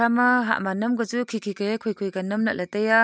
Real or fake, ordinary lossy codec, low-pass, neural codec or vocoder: real; none; none; none